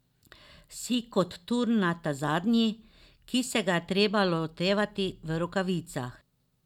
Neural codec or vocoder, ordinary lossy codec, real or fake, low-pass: none; none; real; 19.8 kHz